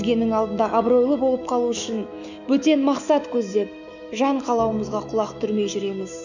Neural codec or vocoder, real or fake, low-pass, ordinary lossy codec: autoencoder, 48 kHz, 128 numbers a frame, DAC-VAE, trained on Japanese speech; fake; 7.2 kHz; none